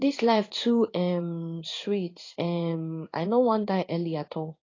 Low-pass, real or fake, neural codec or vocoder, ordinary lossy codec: 7.2 kHz; fake; codec, 16 kHz in and 24 kHz out, 1 kbps, XY-Tokenizer; AAC, 32 kbps